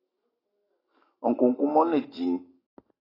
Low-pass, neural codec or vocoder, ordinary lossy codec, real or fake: 5.4 kHz; codec, 44.1 kHz, 7.8 kbps, Pupu-Codec; AAC, 24 kbps; fake